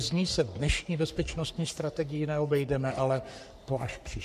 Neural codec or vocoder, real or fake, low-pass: codec, 44.1 kHz, 3.4 kbps, Pupu-Codec; fake; 14.4 kHz